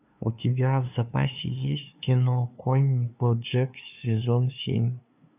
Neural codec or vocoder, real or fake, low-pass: codec, 16 kHz, 2 kbps, FunCodec, trained on LibriTTS, 25 frames a second; fake; 3.6 kHz